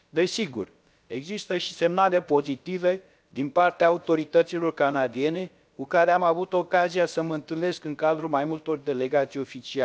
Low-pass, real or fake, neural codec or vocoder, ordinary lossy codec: none; fake; codec, 16 kHz, about 1 kbps, DyCAST, with the encoder's durations; none